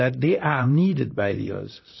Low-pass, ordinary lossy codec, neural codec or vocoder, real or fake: 7.2 kHz; MP3, 24 kbps; vocoder, 44.1 kHz, 128 mel bands, Pupu-Vocoder; fake